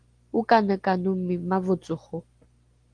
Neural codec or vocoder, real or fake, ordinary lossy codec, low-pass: none; real; Opus, 24 kbps; 9.9 kHz